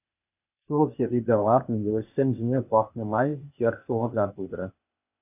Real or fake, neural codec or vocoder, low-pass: fake; codec, 16 kHz, 0.8 kbps, ZipCodec; 3.6 kHz